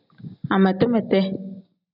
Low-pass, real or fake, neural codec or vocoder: 5.4 kHz; real; none